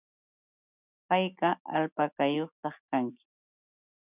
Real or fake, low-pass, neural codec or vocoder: real; 3.6 kHz; none